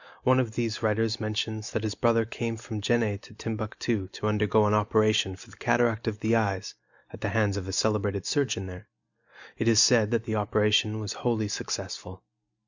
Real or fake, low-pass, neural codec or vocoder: real; 7.2 kHz; none